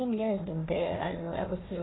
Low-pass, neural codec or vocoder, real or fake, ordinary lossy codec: 7.2 kHz; codec, 16 kHz, 1 kbps, FunCodec, trained on Chinese and English, 50 frames a second; fake; AAC, 16 kbps